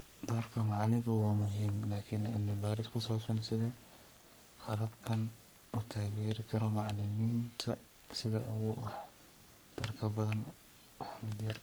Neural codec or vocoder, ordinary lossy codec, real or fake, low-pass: codec, 44.1 kHz, 3.4 kbps, Pupu-Codec; none; fake; none